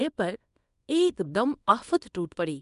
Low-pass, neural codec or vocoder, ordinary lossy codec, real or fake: 10.8 kHz; codec, 24 kHz, 0.9 kbps, WavTokenizer, small release; none; fake